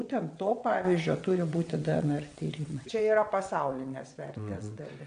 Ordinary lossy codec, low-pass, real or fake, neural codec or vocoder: AAC, 64 kbps; 9.9 kHz; fake; vocoder, 22.05 kHz, 80 mel bands, WaveNeXt